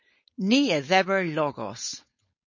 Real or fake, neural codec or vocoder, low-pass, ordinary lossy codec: fake; codec, 16 kHz, 4.8 kbps, FACodec; 7.2 kHz; MP3, 32 kbps